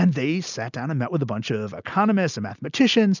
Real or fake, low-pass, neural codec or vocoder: real; 7.2 kHz; none